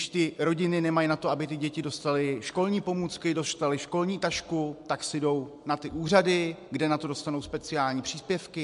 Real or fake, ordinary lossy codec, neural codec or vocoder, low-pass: real; MP3, 64 kbps; none; 10.8 kHz